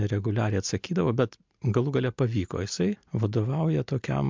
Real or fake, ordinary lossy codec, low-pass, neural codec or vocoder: real; MP3, 64 kbps; 7.2 kHz; none